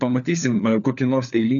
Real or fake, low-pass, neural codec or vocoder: fake; 7.2 kHz; codec, 16 kHz, 4 kbps, FunCodec, trained on LibriTTS, 50 frames a second